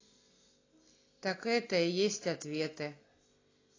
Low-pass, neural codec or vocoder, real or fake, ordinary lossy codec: 7.2 kHz; none; real; AAC, 32 kbps